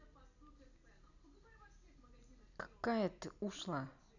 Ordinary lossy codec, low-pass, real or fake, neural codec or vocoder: none; 7.2 kHz; real; none